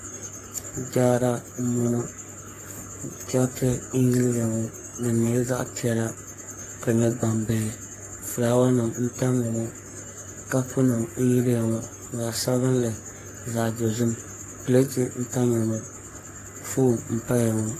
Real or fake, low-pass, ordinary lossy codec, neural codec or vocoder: fake; 14.4 kHz; AAC, 48 kbps; codec, 44.1 kHz, 3.4 kbps, Pupu-Codec